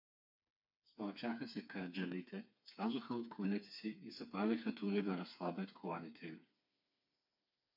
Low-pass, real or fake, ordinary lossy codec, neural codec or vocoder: 5.4 kHz; fake; MP3, 48 kbps; codec, 16 kHz, 4 kbps, FreqCodec, smaller model